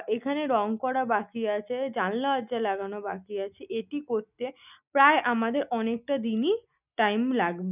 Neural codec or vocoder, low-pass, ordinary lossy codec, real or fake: none; 3.6 kHz; none; real